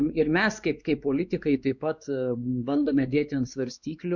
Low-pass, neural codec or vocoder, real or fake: 7.2 kHz; codec, 16 kHz, 2 kbps, X-Codec, WavLM features, trained on Multilingual LibriSpeech; fake